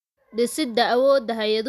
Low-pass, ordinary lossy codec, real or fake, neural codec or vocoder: 14.4 kHz; none; real; none